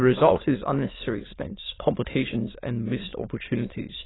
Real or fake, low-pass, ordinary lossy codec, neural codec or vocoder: fake; 7.2 kHz; AAC, 16 kbps; autoencoder, 22.05 kHz, a latent of 192 numbers a frame, VITS, trained on many speakers